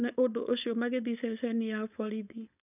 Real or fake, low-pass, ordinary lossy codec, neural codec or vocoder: fake; 3.6 kHz; none; codec, 16 kHz, 4.8 kbps, FACodec